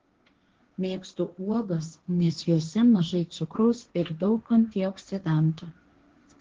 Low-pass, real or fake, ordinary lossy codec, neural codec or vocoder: 7.2 kHz; fake; Opus, 16 kbps; codec, 16 kHz, 1.1 kbps, Voila-Tokenizer